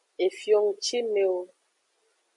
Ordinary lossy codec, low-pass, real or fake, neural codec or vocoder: MP3, 64 kbps; 10.8 kHz; real; none